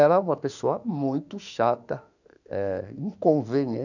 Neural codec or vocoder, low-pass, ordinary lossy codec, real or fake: autoencoder, 48 kHz, 32 numbers a frame, DAC-VAE, trained on Japanese speech; 7.2 kHz; none; fake